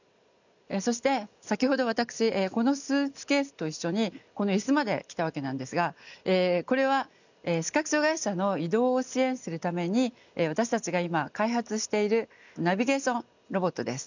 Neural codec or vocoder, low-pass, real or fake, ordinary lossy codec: none; 7.2 kHz; real; none